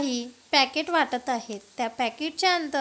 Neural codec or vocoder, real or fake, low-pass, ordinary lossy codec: none; real; none; none